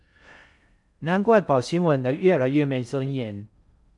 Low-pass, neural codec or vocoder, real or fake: 10.8 kHz; codec, 16 kHz in and 24 kHz out, 0.6 kbps, FocalCodec, streaming, 4096 codes; fake